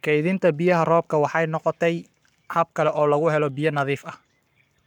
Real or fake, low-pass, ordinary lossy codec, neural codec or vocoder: fake; 19.8 kHz; none; codec, 44.1 kHz, 7.8 kbps, Pupu-Codec